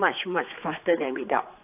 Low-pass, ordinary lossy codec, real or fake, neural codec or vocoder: 3.6 kHz; AAC, 32 kbps; fake; codec, 16 kHz, 4 kbps, X-Codec, HuBERT features, trained on general audio